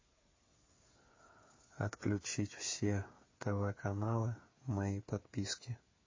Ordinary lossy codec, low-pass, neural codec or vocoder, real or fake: MP3, 32 kbps; 7.2 kHz; codec, 16 kHz, 8 kbps, FreqCodec, smaller model; fake